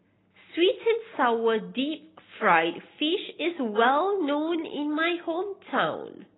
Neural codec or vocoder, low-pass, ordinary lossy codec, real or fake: none; 7.2 kHz; AAC, 16 kbps; real